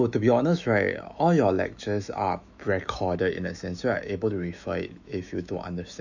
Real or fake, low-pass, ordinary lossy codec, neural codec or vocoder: fake; 7.2 kHz; none; autoencoder, 48 kHz, 128 numbers a frame, DAC-VAE, trained on Japanese speech